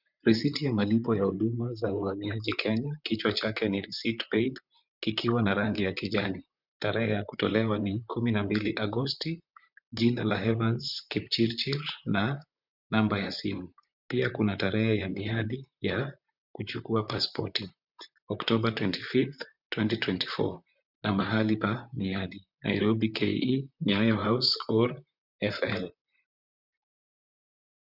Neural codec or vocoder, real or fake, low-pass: vocoder, 44.1 kHz, 128 mel bands, Pupu-Vocoder; fake; 5.4 kHz